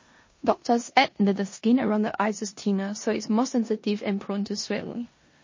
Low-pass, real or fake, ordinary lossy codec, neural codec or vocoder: 7.2 kHz; fake; MP3, 32 kbps; codec, 16 kHz in and 24 kHz out, 0.9 kbps, LongCat-Audio-Codec, four codebook decoder